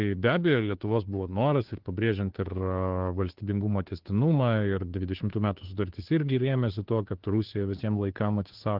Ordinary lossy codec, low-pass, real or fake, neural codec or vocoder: Opus, 16 kbps; 5.4 kHz; fake; codec, 16 kHz, 2 kbps, FunCodec, trained on Chinese and English, 25 frames a second